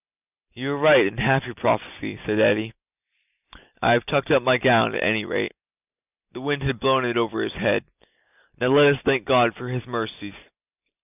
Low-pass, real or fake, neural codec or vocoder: 3.6 kHz; real; none